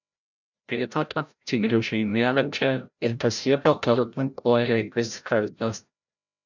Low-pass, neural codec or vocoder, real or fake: 7.2 kHz; codec, 16 kHz, 0.5 kbps, FreqCodec, larger model; fake